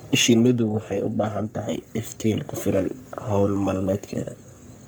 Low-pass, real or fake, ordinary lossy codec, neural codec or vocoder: none; fake; none; codec, 44.1 kHz, 3.4 kbps, Pupu-Codec